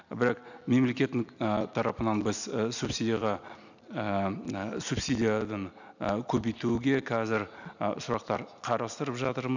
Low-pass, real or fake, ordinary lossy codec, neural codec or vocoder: 7.2 kHz; real; none; none